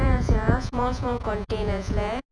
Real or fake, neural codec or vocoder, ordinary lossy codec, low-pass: fake; vocoder, 48 kHz, 128 mel bands, Vocos; none; 9.9 kHz